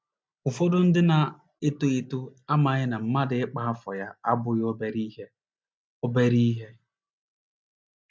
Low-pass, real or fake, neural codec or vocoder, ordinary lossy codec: none; real; none; none